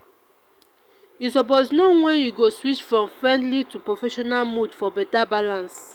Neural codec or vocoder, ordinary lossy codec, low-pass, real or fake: codec, 44.1 kHz, 7.8 kbps, DAC; none; 19.8 kHz; fake